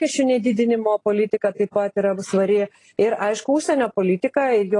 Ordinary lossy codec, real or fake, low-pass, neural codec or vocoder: AAC, 32 kbps; real; 10.8 kHz; none